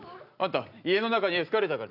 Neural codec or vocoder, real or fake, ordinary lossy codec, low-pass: none; real; none; 5.4 kHz